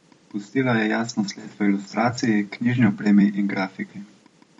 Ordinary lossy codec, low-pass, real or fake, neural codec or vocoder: MP3, 48 kbps; 19.8 kHz; fake; vocoder, 44.1 kHz, 128 mel bands every 256 samples, BigVGAN v2